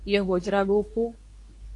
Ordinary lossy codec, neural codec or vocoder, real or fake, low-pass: AAC, 48 kbps; codec, 24 kHz, 0.9 kbps, WavTokenizer, medium speech release version 2; fake; 10.8 kHz